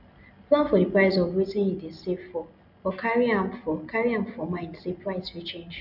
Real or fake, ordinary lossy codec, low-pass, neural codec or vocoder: real; none; 5.4 kHz; none